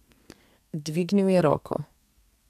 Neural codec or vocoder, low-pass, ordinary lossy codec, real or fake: codec, 32 kHz, 1.9 kbps, SNAC; 14.4 kHz; none; fake